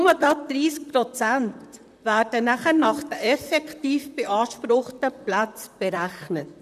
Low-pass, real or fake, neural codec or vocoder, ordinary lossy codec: 14.4 kHz; fake; vocoder, 44.1 kHz, 128 mel bands, Pupu-Vocoder; none